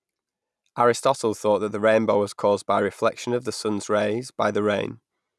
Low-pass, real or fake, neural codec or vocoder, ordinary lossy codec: none; real; none; none